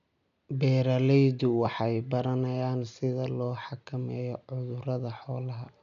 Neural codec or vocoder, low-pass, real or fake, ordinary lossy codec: none; 7.2 kHz; real; MP3, 96 kbps